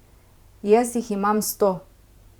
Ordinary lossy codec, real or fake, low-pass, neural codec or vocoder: Opus, 64 kbps; fake; 19.8 kHz; vocoder, 44.1 kHz, 128 mel bands every 512 samples, BigVGAN v2